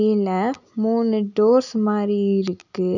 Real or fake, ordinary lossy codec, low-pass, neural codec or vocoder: real; none; 7.2 kHz; none